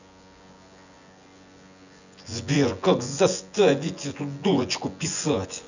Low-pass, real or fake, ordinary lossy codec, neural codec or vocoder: 7.2 kHz; fake; none; vocoder, 24 kHz, 100 mel bands, Vocos